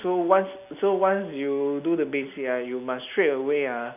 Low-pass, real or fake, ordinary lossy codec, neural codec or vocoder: 3.6 kHz; real; none; none